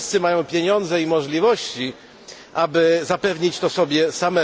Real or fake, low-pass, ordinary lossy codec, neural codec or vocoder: real; none; none; none